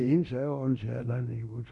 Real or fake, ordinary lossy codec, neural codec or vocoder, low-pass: fake; none; codec, 24 kHz, 0.9 kbps, DualCodec; 10.8 kHz